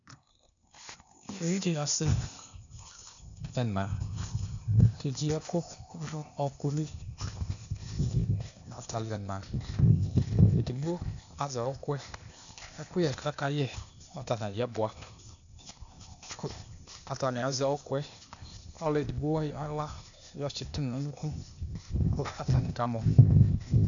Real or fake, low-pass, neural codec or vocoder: fake; 7.2 kHz; codec, 16 kHz, 0.8 kbps, ZipCodec